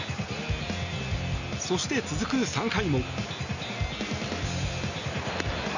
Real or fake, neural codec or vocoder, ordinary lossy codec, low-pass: real; none; none; 7.2 kHz